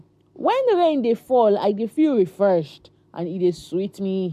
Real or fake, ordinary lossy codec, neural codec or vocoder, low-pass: real; MP3, 64 kbps; none; 14.4 kHz